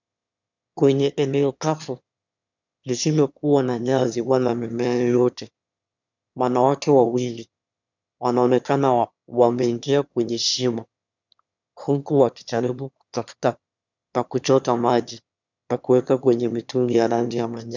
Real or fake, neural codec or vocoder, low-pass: fake; autoencoder, 22.05 kHz, a latent of 192 numbers a frame, VITS, trained on one speaker; 7.2 kHz